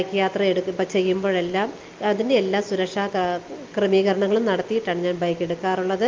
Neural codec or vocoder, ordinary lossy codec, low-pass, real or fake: none; Opus, 24 kbps; 7.2 kHz; real